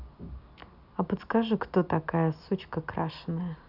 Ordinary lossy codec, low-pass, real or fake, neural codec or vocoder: none; 5.4 kHz; real; none